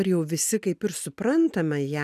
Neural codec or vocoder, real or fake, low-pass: none; real; 14.4 kHz